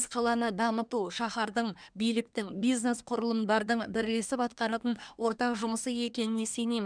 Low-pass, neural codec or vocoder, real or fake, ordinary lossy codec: 9.9 kHz; codec, 24 kHz, 1 kbps, SNAC; fake; none